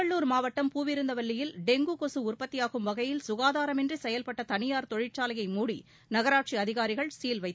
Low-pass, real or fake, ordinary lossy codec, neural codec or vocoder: none; real; none; none